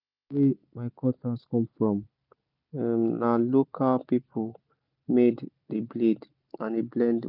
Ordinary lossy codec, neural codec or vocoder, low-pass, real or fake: none; none; 5.4 kHz; real